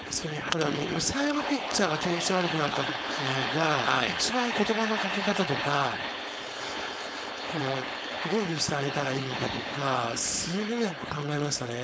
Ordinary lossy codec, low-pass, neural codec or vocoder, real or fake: none; none; codec, 16 kHz, 4.8 kbps, FACodec; fake